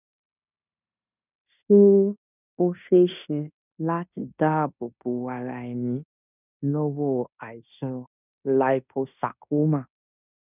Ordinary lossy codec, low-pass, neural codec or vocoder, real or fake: none; 3.6 kHz; codec, 16 kHz in and 24 kHz out, 0.9 kbps, LongCat-Audio-Codec, fine tuned four codebook decoder; fake